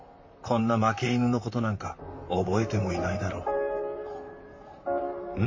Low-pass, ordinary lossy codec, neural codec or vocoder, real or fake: 7.2 kHz; MP3, 32 kbps; vocoder, 44.1 kHz, 128 mel bands, Pupu-Vocoder; fake